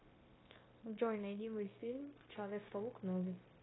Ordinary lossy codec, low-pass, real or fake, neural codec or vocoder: AAC, 16 kbps; 7.2 kHz; fake; codec, 16 kHz, 0.9 kbps, LongCat-Audio-Codec